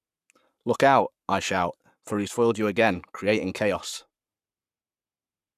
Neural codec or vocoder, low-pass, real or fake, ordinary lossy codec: codec, 44.1 kHz, 7.8 kbps, Pupu-Codec; 14.4 kHz; fake; none